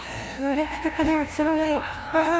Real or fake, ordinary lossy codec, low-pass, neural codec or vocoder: fake; none; none; codec, 16 kHz, 0.5 kbps, FunCodec, trained on LibriTTS, 25 frames a second